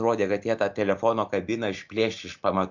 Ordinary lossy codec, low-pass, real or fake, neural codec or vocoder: MP3, 64 kbps; 7.2 kHz; real; none